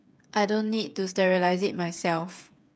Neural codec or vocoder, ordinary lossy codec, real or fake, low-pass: codec, 16 kHz, 8 kbps, FreqCodec, smaller model; none; fake; none